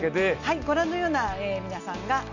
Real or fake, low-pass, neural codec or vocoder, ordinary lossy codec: real; 7.2 kHz; none; none